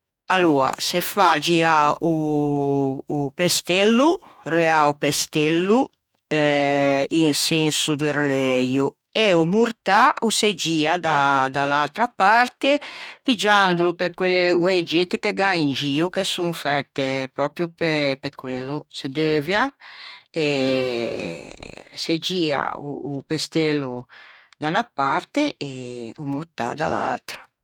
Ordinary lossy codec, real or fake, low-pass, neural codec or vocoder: none; fake; 19.8 kHz; codec, 44.1 kHz, 2.6 kbps, DAC